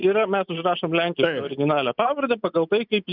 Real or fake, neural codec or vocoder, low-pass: real; none; 5.4 kHz